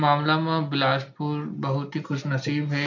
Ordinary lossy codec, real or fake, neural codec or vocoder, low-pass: none; real; none; 7.2 kHz